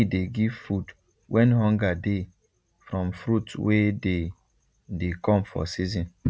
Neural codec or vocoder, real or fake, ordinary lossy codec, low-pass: none; real; none; none